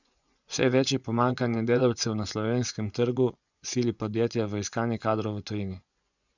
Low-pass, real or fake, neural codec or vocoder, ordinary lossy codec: 7.2 kHz; fake; vocoder, 24 kHz, 100 mel bands, Vocos; none